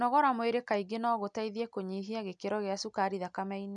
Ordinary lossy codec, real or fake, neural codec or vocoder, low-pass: none; real; none; 9.9 kHz